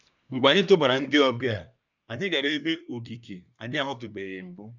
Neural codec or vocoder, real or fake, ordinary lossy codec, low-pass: codec, 24 kHz, 1 kbps, SNAC; fake; none; 7.2 kHz